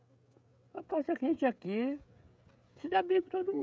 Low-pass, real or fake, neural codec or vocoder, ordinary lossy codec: none; fake; codec, 16 kHz, 16 kbps, FreqCodec, smaller model; none